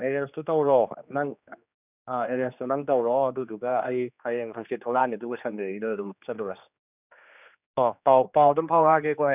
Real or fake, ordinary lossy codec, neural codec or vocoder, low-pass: fake; none; codec, 16 kHz, 2 kbps, X-Codec, HuBERT features, trained on general audio; 3.6 kHz